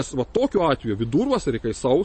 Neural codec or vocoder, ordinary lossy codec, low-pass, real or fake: none; MP3, 32 kbps; 10.8 kHz; real